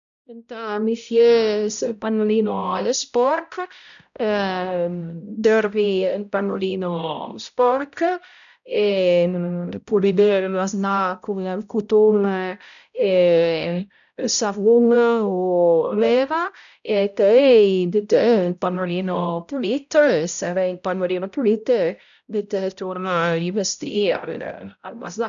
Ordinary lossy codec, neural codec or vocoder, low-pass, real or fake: none; codec, 16 kHz, 0.5 kbps, X-Codec, HuBERT features, trained on balanced general audio; 7.2 kHz; fake